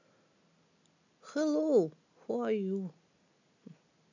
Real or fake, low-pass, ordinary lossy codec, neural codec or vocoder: real; 7.2 kHz; none; none